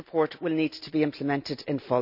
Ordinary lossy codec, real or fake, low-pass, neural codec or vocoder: none; real; 5.4 kHz; none